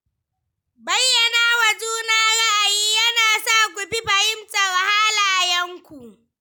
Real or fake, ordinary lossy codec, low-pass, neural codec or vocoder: real; none; none; none